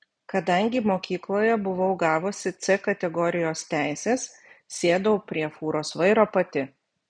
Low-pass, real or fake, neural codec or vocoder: 9.9 kHz; real; none